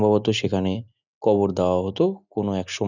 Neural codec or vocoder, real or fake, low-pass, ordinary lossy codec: none; real; 7.2 kHz; none